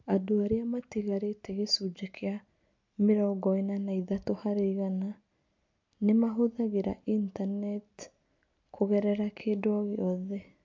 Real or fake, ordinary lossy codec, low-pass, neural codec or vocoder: real; MP3, 48 kbps; 7.2 kHz; none